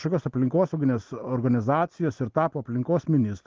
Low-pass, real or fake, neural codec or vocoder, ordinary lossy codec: 7.2 kHz; real; none; Opus, 16 kbps